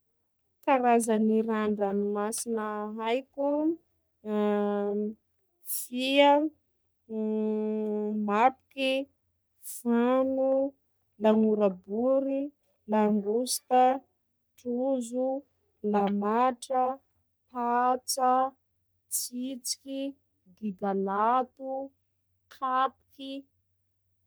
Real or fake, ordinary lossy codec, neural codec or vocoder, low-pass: fake; none; codec, 44.1 kHz, 3.4 kbps, Pupu-Codec; none